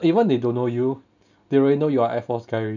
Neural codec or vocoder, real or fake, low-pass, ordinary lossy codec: none; real; 7.2 kHz; none